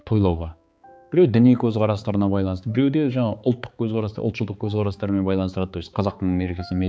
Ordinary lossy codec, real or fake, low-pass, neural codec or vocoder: none; fake; none; codec, 16 kHz, 4 kbps, X-Codec, HuBERT features, trained on balanced general audio